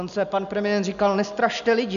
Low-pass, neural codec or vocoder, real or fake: 7.2 kHz; none; real